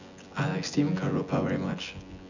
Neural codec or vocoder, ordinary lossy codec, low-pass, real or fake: vocoder, 24 kHz, 100 mel bands, Vocos; none; 7.2 kHz; fake